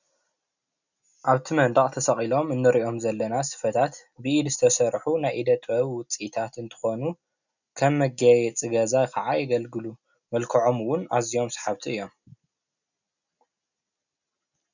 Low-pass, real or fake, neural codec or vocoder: 7.2 kHz; real; none